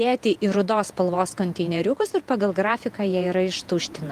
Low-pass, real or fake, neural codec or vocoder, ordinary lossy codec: 14.4 kHz; fake; vocoder, 48 kHz, 128 mel bands, Vocos; Opus, 32 kbps